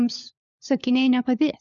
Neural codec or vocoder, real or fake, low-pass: codec, 16 kHz, 4 kbps, FunCodec, trained on LibriTTS, 50 frames a second; fake; 7.2 kHz